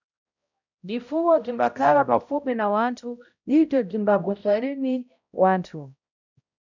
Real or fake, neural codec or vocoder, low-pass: fake; codec, 16 kHz, 0.5 kbps, X-Codec, HuBERT features, trained on balanced general audio; 7.2 kHz